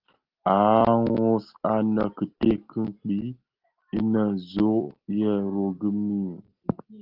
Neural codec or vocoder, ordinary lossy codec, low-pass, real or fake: none; Opus, 16 kbps; 5.4 kHz; real